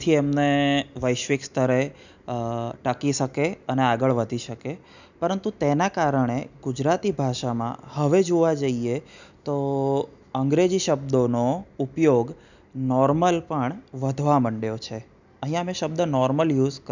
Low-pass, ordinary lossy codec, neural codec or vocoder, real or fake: 7.2 kHz; none; none; real